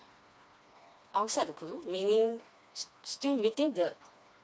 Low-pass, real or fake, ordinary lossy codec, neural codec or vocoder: none; fake; none; codec, 16 kHz, 2 kbps, FreqCodec, smaller model